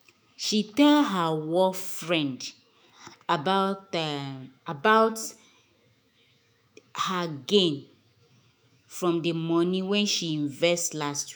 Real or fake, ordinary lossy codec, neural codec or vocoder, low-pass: fake; none; autoencoder, 48 kHz, 128 numbers a frame, DAC-VAE, trained on Japanese speech; none